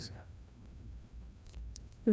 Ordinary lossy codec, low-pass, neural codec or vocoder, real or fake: none; none; codec, 16 kHz, 1 kbps, FreqCodec, larger model; fake